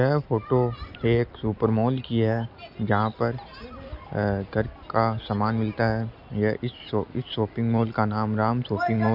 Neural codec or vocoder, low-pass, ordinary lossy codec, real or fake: none; 5.4 kHz; none; real